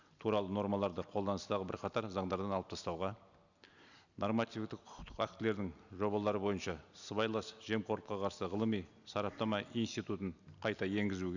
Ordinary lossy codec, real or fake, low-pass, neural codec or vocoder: none; real; 7.2 kHz; none